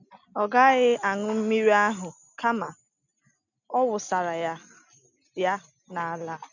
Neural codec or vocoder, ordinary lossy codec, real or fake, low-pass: none; none; real; 7.2 kHz